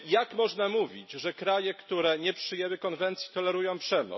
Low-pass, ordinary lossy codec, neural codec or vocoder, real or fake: 7.2 kHz; MP3, 24 kbps; none; real